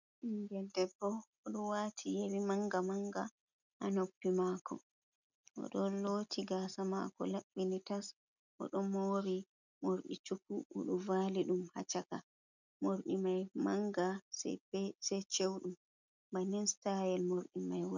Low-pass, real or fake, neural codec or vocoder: 7.2 kHz; real; none